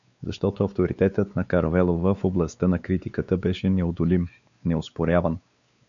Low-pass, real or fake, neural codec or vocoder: 7.2 kHz; fake; codec, 16 kHz, 4 kbps, X-Codec, WavLM features, trained on Multilingual LibriSpeech